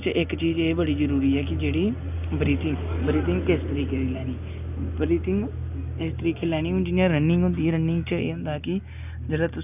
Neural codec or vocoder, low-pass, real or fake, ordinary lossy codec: none; 3.6 kHz; real; none